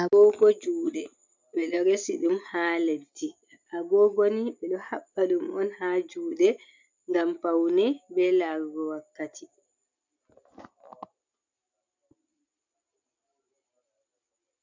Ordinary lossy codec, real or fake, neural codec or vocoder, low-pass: MP3, 64 kbps; real; none; 7.2 kHz